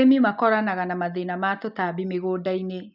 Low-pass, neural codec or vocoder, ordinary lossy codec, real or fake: 5.4 kHz; none; none; real